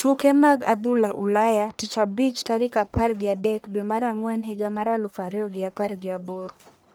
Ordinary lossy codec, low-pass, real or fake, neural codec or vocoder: none; none; fake; codec, 44.1 kHz, 1.7 kbps, Pupu-Codec